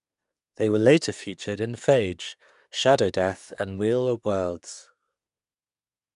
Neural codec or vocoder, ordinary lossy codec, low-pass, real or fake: codec, 24 kHz, 1 kbps, SNAC; none; 10.8 kHz; fake